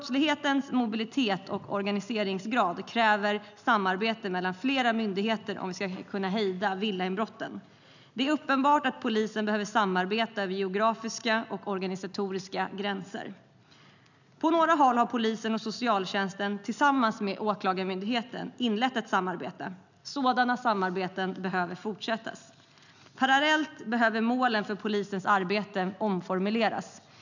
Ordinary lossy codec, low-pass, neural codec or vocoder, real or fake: none; 7.2 kHz; none; real